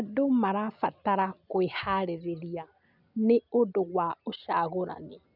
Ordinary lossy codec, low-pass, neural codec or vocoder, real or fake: none; 5.4 kHz; none; real